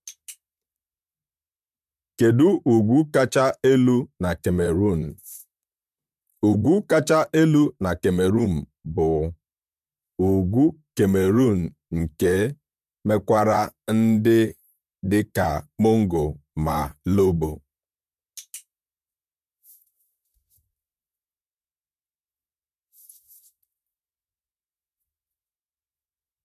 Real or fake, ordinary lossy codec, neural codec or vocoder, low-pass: fake; MP3, 96 kbps; vocoder, 44.1 kHz, 128 mel bands, Pupu-Vocoder; 14.4 kHz